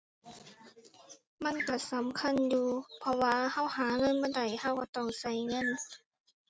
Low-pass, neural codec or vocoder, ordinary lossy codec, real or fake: none; none; none; real